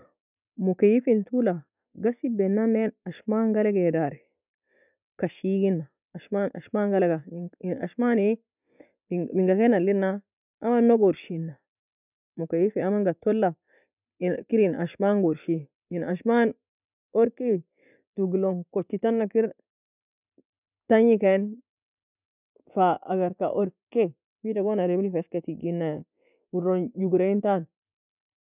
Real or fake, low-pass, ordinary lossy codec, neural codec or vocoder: real; 3.6 kHz; none; none